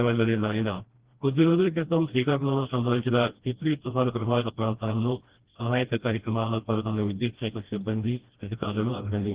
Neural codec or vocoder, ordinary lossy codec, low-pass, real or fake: codec, 16 kHz, 1 kbps, FreqCodec, smaller model; Opus, 16 kbps; 3.6 kHz; fake